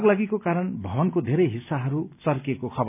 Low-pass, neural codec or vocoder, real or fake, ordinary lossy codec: 3.6 kHz; none; real; none